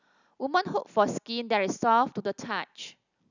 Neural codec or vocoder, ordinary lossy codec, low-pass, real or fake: none; none; 7.2 kHz; real